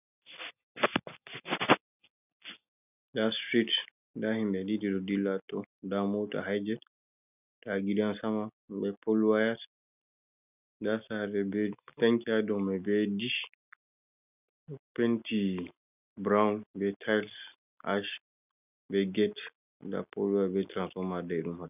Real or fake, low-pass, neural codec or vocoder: real; 3.6 kHz; none